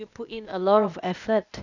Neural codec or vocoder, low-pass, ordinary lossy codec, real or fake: codec, 16 kHz, 0.8 kbps, ZipCodec; 7.2 kHz; none; fake